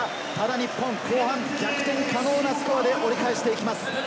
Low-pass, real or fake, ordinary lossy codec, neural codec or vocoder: none; real; none; none